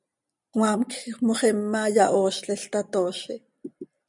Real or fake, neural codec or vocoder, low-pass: real; none; 10.8 kHz